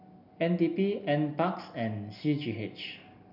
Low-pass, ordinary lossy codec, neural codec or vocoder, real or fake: 5.4 kHz; none; none; real